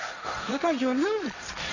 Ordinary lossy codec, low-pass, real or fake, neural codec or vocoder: none; 7.2 kHz; fake; codec, 16 kHz, 1.1 kbps, Voila-Tokenizer